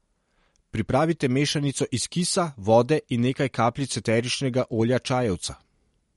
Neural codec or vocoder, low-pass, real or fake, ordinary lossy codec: none; 19.8 kHz; real; MP3, 48 kbps